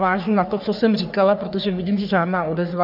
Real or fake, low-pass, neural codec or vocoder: fake; 5.4 kHz; codec, 44.1 kHz, 3.4 kbps, Pupu-Codec